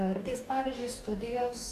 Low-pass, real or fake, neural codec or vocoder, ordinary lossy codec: 14.4 kHz; fake; codec, 44.1 kHz, 2.6 kbps, DAC; AAC, 96 kbps